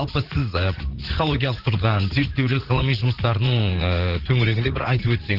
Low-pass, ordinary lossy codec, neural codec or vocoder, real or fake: 5.4 kHz; Opus, 16 kbps; vocoder, 44.1 kHz, 80 mel bands, Vocos; fake